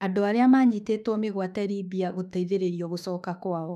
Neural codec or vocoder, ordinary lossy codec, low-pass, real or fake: autoencoder, 48 kHz, 32 numbers a frame, DAC-VAE, trained on Japanese speech; none; 14.4 kHz; fake